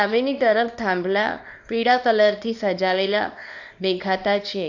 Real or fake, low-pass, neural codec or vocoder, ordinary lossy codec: fake; 7.2 kHz; codec, 24 kHz, 0.9 kbps, WavTokenizer, small release; none